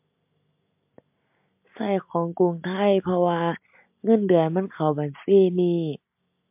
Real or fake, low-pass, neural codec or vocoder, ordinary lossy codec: real; 3.6 kHz; none; MP3, 32 kbps